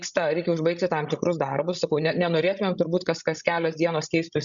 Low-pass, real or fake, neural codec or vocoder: 7.2 kHz; fake; codec, 16 kHz, 16 kbps, FreqCodec, larger model